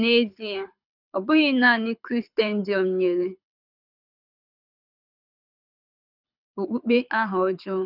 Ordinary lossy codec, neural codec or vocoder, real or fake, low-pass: none; codec, 24 kHz, 6 kbps, HILCodec; fake; 5.4 kHz